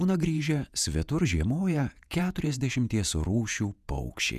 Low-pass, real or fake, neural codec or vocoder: 14.4 kHz; fake; vocoder, 48 kHz, 128 mel bands, Vocos